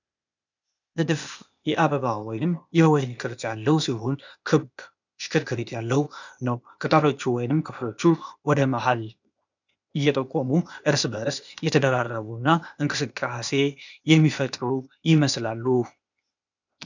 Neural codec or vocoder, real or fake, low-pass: codec, 16 kHz, 0.8 kbps, ZipCodec; fake; 7.2 kHz